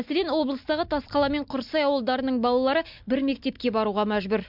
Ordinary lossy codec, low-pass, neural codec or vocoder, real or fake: MP3, 48 kbps; 5.4 kHz; none; real